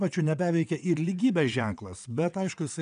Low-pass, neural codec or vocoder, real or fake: 9.9 kHz; vocoder, 22.05 kHz, 80 mel bands, WaveNeXt; fake